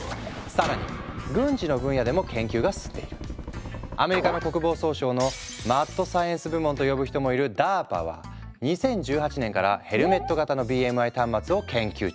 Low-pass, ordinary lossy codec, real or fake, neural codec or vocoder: none; none; real; none